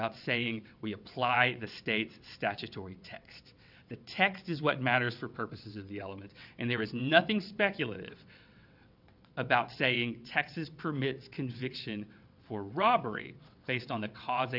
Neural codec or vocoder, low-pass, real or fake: vocoder, 22.05 kHz, 80 mel bands, WaveNeXt; 5.4 kHz; fake